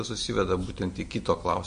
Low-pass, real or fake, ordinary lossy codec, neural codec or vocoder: 9.9 kHz; real; MP3, 48 kbps; none